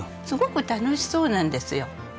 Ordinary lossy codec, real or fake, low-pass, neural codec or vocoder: none; real; none; none